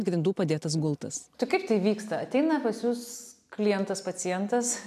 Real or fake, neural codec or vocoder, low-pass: real; none; 14.4 kHz